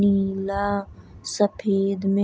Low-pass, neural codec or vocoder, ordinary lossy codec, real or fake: none; none; none; real